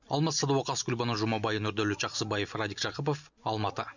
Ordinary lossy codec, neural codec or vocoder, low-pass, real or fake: none; none; 7.2 kHz; real